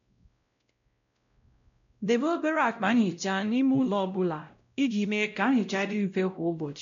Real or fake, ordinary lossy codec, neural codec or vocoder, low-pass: fake; MP3, 64 kbps; codec, 16 kHz, 0.5 kbps, X-Codec, WavLM features, trained on Multilingual LibriSpeech; 7.2 kHz